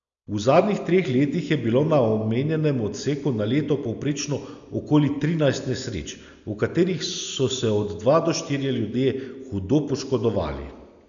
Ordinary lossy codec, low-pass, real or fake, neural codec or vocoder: none; 7.2 kHz; real; none